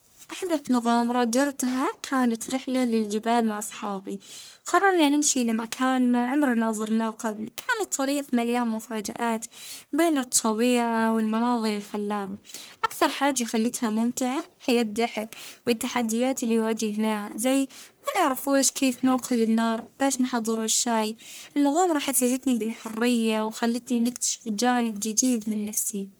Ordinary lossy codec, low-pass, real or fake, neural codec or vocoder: none; none; fake; codec, 44.1 kHz, 1.7 kbps, Pupu-Codec